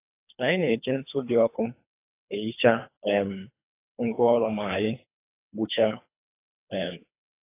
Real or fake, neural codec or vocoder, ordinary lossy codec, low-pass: fake; codec, 24 kHz, 3 kbps, HILCodec; AAC, 24 kbps; 3.6 kHz